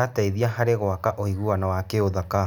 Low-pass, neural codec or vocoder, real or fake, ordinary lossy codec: 19.8 kHz; none; real; none